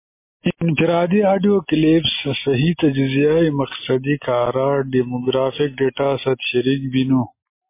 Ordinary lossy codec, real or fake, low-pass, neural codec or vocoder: MP3, 24 kbps; real; 3.6 kHz; none